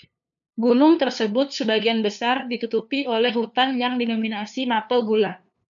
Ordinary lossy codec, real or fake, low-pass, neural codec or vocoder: MP3, 96 kbps; fake; 7.2 kHz; codec, 16 kHz, 2 kbps, FunCodec, trained on LibriTTS, 25 frames a second